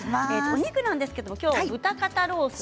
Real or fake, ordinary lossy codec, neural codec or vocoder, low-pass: real; none; none; none